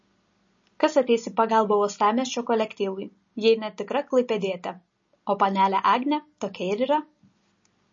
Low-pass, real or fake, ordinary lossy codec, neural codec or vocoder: 7.2 kHz; real; MP3, 32 kbps; none